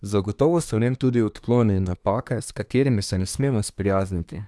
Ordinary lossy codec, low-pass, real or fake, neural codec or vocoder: none; none; fake; codec, 24 kHz, 1 kbps, SNAC